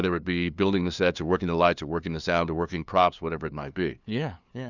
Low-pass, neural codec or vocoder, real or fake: 7.2 kHz; codec, 16 kHz, 2 kbps, FunCodec, trained on LibriTTS, 25 frames a second; fake